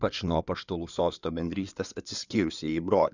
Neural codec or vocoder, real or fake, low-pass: codec, 16 kHz in and 24 kHz out, 2.2 kbps, FireRedTTS-2 codec; fake; 7.2 kHz